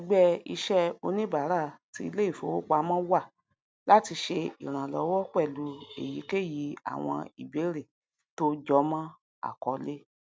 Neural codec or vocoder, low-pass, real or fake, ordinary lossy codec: none; none; real; none